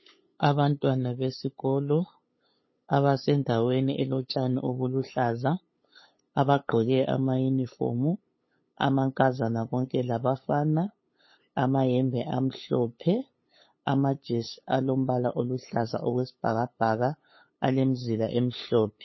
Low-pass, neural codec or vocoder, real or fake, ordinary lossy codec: 7.2 kHz; codec, 16 kHz, 8 kbps, FunCodec, trained on LibriTTS, 25 frames a second; fake; MP3, 24 kbps